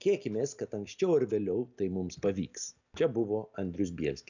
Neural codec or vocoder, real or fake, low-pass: none; real; 7.2 kHz